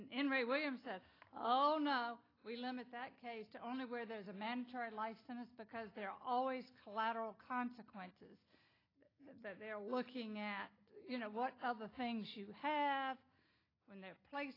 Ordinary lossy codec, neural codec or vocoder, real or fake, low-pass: AAC, 24 kbps; none; real; 5.4 kHz